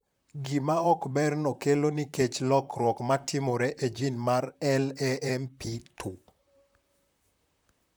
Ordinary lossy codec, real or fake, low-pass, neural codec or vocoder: none; fake; none; vocoder, 44.1 kHz, 128 mel bands, Pupu-Vocoder